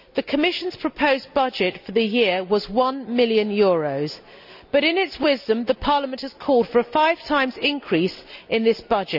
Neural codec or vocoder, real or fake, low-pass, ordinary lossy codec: none; real; 5.4 kHz; none